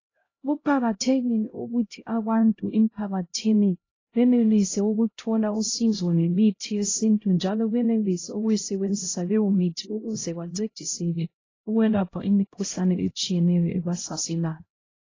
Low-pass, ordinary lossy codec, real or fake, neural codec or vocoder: 7.2 kHz; AAC, 32 kbps; fake; codec, 16 kHz, 0.5 kbps, X-Codec, HuBERT features, trained on LibriSpeech